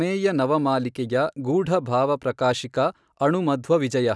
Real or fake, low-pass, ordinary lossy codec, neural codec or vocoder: real; none; none; none